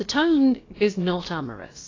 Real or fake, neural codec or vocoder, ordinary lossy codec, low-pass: fake; codec, 16 kHz, about 1 kbps, DyCAST, with the encoder's durations; AAC, 32 kbps; 7.2 kHz